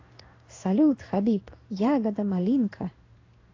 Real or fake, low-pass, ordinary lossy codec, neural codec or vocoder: fake; 7.2 kHz; AAC, 32 kbps; codec, 16 kHz in and 24 kHz out, 1 kbps, XY-Tokenizer